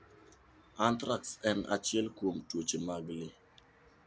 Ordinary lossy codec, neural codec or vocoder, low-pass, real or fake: none; none; none; real